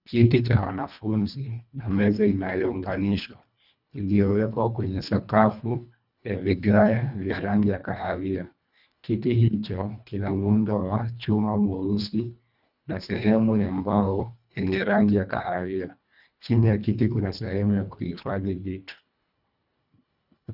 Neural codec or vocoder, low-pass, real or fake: codec, 24 kHz, 1.5 kbps, HILCodec; 5.4 kHz; fake